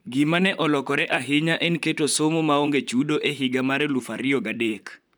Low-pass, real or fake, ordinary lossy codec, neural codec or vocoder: none; fake; none; vocoder, 44.1 kHz, 128 mel bands, Pupu-Vocoder